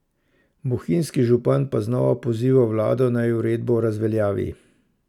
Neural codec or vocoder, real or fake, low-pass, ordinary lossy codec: none; real; 19.8 kHz; none